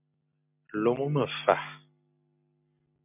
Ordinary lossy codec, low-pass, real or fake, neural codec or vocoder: MP3, 32 kbps; 3.6 kHz; real; none